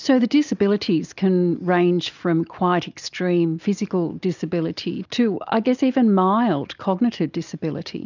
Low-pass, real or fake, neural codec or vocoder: 7.2 kHz; real; none